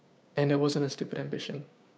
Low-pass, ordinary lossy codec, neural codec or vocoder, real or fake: none; none; codec, 16 kHz, 6 kbps, DAC; fake